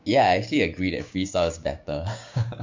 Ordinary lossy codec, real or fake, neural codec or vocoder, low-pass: MP3, 64 kbps; fake; codec, 16 kHz, 6 kbps, DAC; 7.2 kHz